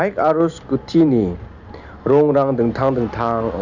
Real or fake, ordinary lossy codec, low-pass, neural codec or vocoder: real; none; 7.2 kHz; none